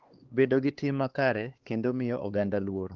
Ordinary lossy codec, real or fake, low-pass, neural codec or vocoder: Opus, 16 kbps; fake; 7.2 kHz; codec, 16 kHz, 2 kbps, X-Codec, HuBERT features, trained on LibriSpeech